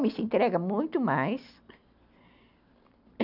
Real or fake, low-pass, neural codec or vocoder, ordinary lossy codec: real; 5.4 kHz; none; none